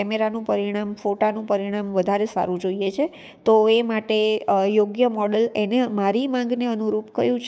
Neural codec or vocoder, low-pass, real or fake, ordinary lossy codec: codec, 16 kHz, 6 kbps, DAC; none; fake; none